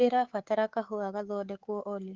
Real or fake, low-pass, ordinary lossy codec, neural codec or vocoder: fake; 7.2 kHz; Opus, 16 kbps; codec, 24 kHz, 3.1 kbps, DualCodec